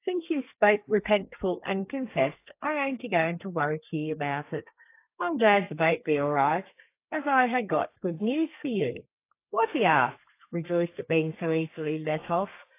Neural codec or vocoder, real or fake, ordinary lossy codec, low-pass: codec, 32 kHz, 1.9 kbps, SNAC; fake; AAC, 24 kbps; 3.6 kHz